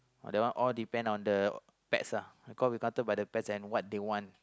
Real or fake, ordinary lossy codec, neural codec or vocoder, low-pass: real; none; none; none